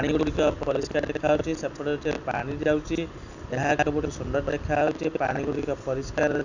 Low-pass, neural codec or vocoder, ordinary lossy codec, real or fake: 7.2 kHz; none; none; real